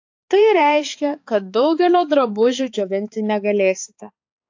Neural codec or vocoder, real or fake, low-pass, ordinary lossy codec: codec, 16 kHz, 4 kbps, X-Codec, HuBERT features, trained on balanced general audio; fake; 7.2 kHz; AAC, 48 kbps